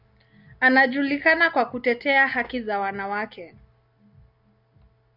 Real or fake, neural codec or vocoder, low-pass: real; none; 5.4 kHz